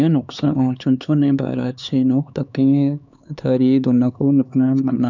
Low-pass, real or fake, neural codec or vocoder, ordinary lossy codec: 7.2 kHz; fake; codec, 16 kHz, 4 kbps, X-Codec, HuBERT features, trained on LibriSpeech; none